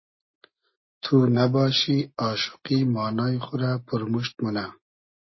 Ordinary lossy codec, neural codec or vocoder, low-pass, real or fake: MP3, 24 kbps; none; 7.2 kHz; real